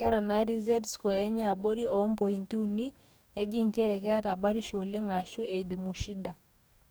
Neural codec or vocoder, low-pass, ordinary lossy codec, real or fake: codec, 44.1 kHz, 2.6 kbps, DAC; none; none; fake